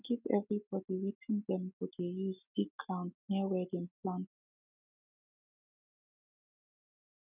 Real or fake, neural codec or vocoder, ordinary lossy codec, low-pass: real; none; none; 3.6 kHz